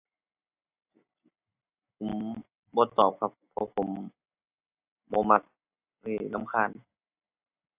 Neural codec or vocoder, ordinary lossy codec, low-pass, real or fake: none; AAC, 32 kbps; 3.6 kHz; real